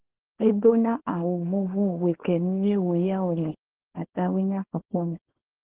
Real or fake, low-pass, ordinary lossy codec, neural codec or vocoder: fake; 3.6 kHz; Opus, 16 kbps; codec, 24 kHz, 0.9 kbps, WavTokenizer, small release